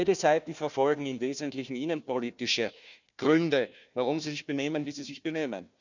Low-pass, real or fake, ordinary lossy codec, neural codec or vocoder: 7.2 kHz; fake; none; codec, 16 kHz, 1 kbps, FunCodec, trained on Chinese and English, 50 frames a second